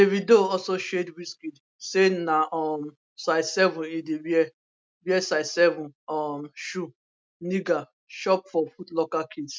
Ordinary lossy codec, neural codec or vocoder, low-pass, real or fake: none; none; none; real